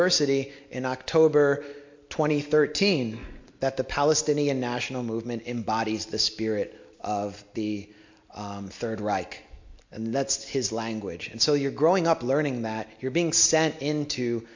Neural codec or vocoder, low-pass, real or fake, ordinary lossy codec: none; 7.2 kHz; real; MP3, 48 kbps